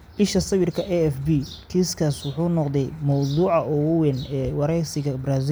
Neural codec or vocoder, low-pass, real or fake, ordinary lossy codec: none; none; real; none